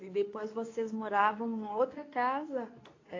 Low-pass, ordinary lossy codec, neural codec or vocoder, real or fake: 7.2 kHz; AAC, 32 kbps; codec, 24 kHz, 0.9 kbps, WavTokenizer, medium speech release version 2; fake